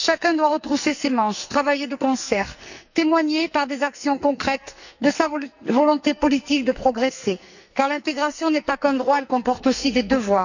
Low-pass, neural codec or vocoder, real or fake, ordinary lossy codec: 7.2 kHz; codec, 44.1 kHz, 2.6 kbps, SNAC; fake; none